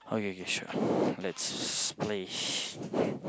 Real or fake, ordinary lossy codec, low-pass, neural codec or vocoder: real; none; none; none